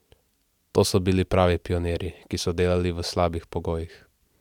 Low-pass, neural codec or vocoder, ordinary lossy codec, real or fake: 19.8 kHz; none; none; real